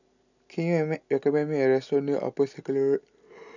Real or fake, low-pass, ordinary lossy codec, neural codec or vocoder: real; 7.2 kHz; none; none